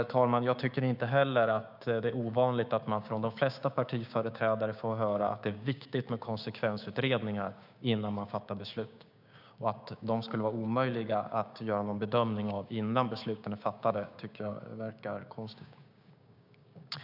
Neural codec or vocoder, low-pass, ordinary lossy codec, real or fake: codec, 16 kHz, 6 kbps, DAC; 5.4 kHz; none; fake